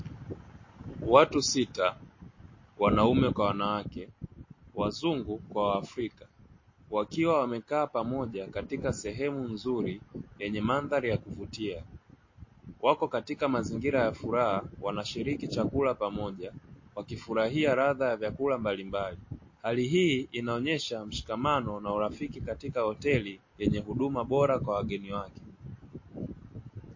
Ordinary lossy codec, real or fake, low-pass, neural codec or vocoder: MP3, 32 kbps; real; 7.2 kHz; none